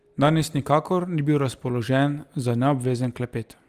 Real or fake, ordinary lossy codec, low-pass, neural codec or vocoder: real; Opus, 24 kbps; 14.4 kHz; none